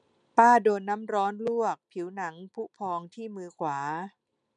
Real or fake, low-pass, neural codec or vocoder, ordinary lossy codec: real; 9.9 kHz; none; none